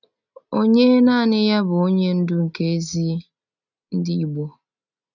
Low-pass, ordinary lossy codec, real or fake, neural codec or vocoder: 7.2 kHz; none; real; none